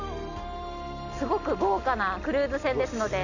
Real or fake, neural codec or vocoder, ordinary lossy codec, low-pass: real; none; none; 7.2 kHz